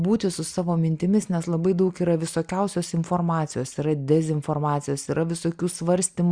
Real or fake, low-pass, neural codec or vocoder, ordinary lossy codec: real; 9.9 kHz; none; Opus, 64 kbps